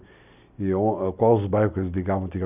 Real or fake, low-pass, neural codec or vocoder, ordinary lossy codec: real; 3.6 kHz; none; none